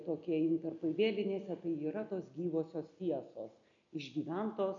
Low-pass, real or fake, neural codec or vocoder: 7.2 kHz; real; none